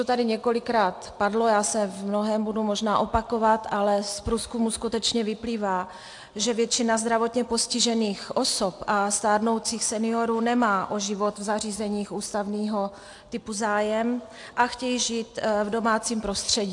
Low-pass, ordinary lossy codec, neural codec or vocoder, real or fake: 10.8 kHz; AAC, 64 kbps; none; real